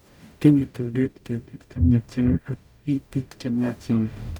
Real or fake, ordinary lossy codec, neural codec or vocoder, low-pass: fake; none; codec, 44.1 kHz, 0.9 kbps, DAC; 19.8 kHz